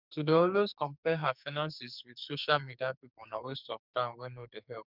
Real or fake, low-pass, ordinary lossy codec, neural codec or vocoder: fake; 5.4 kHz; none; codec, 44.1 kHz, 2.6 kbps, SNAC